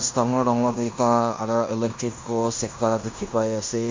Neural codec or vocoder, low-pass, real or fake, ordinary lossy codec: codec, 16 kHz in and 24 kHz out, 0.9 kbps, LongCat-Audio-Codec, fine tuned four codebook decoder; 7.2 kHz; fake; MP3, 48 kbps